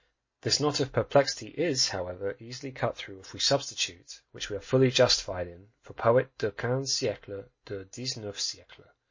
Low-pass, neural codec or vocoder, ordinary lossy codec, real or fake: 7.2 kHz; none; MP3, 32 kbps; real